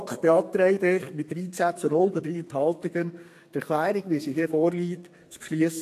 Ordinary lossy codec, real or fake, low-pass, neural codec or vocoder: AAC, 64 kbps; fake; 14.4 kHz; codec, 32 kHz, 1.9 kbps, SNAC